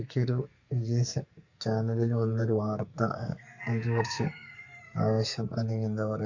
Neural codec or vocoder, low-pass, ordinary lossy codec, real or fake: codec, 44.1 kHz, 2.6 kbps, SNAC; 7.2 kHz; Opus, 64 kbps; fake